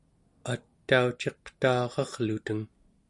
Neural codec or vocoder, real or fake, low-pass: none; real; 10.8 kHz